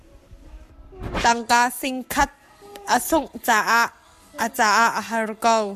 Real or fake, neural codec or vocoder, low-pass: fake; codec, 44.1 kHz, 7.8 kbps, Pupu-Codec; 14.4 kHz